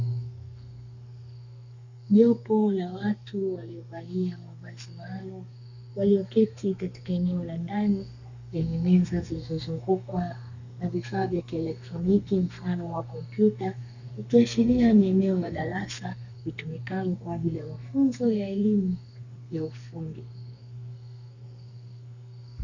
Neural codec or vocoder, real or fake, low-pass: codec, 32 kHz, 1.9 kbps, SNAC; fake; 7.2 kHz